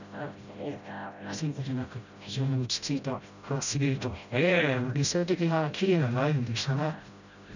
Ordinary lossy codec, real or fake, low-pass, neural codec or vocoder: none; fake; 7.2 kHz; codec, 16 kHz, 0.5 kbps, FreqCodec, smaller model